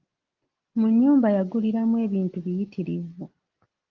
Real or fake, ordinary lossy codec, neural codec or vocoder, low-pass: real; Opus, 32 kbps; none; 7.2 kHz